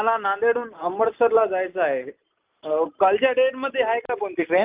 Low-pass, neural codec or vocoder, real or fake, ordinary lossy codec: 3.6 kHz; none; real; Opus, 24 kbps